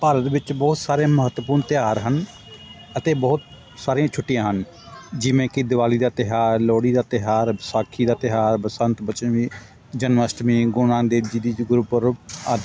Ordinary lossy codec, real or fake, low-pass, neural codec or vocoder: none; real; none; none